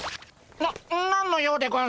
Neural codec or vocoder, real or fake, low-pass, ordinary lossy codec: none; real; none; none